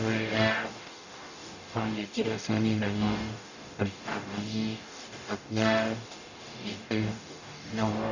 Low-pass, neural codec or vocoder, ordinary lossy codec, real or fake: 7.2 kHz; codec, 44.1 kHz, 0.9 kbps, DAC; none; fake